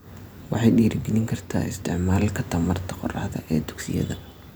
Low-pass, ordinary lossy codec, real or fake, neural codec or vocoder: none; none; real; none